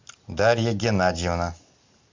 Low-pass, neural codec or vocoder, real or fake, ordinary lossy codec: 7.2 kHz; none; real; MP3, 64 kbps